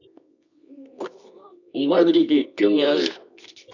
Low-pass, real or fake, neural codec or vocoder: 7.2 kHz; fake; codec, 24 kHz, 0.9 kbps, WavTokenizer, medium music audio release